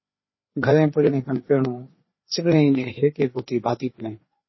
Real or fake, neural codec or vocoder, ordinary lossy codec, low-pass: fake; codec, 16 kHz, 4 kbps, FreqCodec, larger model; MP3, 24 kbps; 7.2 kHz